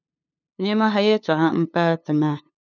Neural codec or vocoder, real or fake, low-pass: codec, 16 kHz, 2 kbps, FunCodec, trained on LibriTTS, 25 frames a second; fake; 7.2 kHz